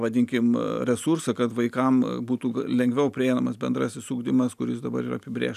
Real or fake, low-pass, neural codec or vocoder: real; 14.4 kHz; none